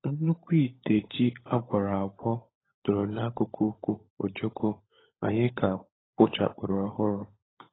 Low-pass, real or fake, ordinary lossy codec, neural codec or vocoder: 7.2 kHz; fake; AAC, 16 kbps; codec, 16 kHz, 16 kbps, FunCodec, trained on LibriTTS, 50 frames a second